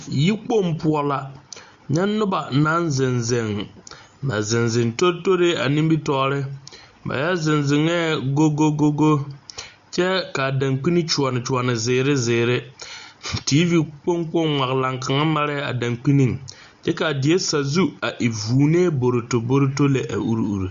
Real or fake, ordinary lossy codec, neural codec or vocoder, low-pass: real; Opus, 64 kbps; none; 7.2 kHz